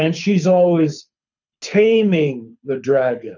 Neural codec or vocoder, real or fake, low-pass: codec, 24 kHz, 6 kbps, HILCodec; fake; 7.2 kHz